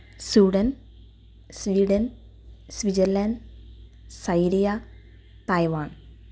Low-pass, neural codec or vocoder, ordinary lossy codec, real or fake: none; none; none; real